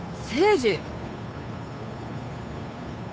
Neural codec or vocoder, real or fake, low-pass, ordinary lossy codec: codec, 16 kHz, 2 kbps, FunCodec, trained on Chinese and English, 25 frames a second; fake; none; none